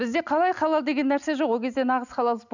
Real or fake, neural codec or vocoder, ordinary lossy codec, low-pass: real; none; none; 7.2 kHz